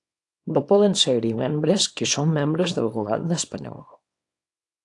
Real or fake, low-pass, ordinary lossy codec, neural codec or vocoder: fake; 10.8 kHz; AAC, 48 kbps; codec, 24 kHz, 0.9 kbps, WavTokenizer, small release